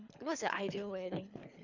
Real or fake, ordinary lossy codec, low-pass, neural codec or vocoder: fake; none; 7.2 kHz; codec, 24 kHz, 3 kbps, HILCodec